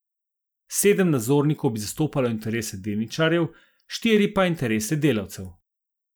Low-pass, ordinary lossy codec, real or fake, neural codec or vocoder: none; none; real; none